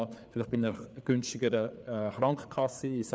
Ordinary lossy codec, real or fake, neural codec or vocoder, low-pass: none; fake; codec, 16 kHz, 4 kbps, FreqCodec, larger model; none